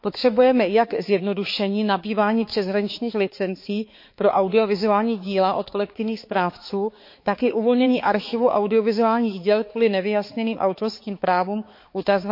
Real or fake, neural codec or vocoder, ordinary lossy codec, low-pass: fake; codec, 16 kHz, 4 kbps, X-Codec, HuBERT features, trained on balanced general audio; MP3, 32 kbps; 5.4 kHz